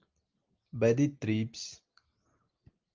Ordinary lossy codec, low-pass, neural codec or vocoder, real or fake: Opus, 24 kbps; 7.2 kHz; none; real